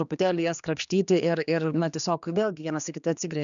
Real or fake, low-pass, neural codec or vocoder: fake; 7.2 kHz; codec, 16 kHz, 2 kbps, X-Codec, HuBERT features, trained on general audio